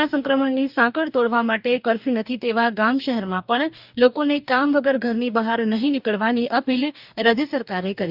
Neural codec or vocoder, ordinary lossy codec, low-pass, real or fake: codec, 44.1 kHz, 2.6 kbps, DAC; none; 5.4 kHz; fake